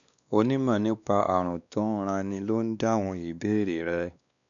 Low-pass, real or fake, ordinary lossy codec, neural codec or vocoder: 7.2 kHz; fake; none; codec, 16 kHz, 2 kbps, X-Codec, WavLM features, trained on Multilingual LibriSpeech